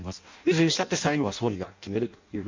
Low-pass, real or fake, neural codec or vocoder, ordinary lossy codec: 7.2 kHz; fake; codec, 16 kHz in and 24 kHz out, 0.6 kbps, FireRedTTS-2 codec; MP3, 64 kbps